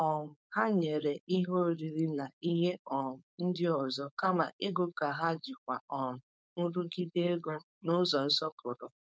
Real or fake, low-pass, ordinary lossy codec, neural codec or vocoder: fake; none; none; codec, 16 kHz, 4.8 kbps, FACodec